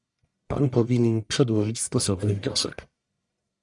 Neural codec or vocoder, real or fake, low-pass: codec, 44.1 kHz, 1.7 kbps, Pupu-Codec; fake; 10.8 kHz